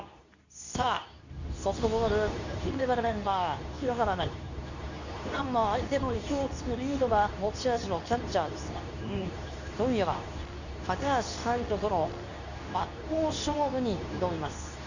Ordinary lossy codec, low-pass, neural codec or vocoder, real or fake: none; 7.2 kHz; codec, 24 kHz, 0.9 kbps, WavTokenizer, medium speech release version 2; fake